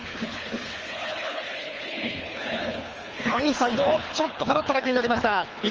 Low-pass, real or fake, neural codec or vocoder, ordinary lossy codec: 7.2 kHz; fake; codec, 24 kHz, 3 kbps, HILCodec; Opus, 24 kbps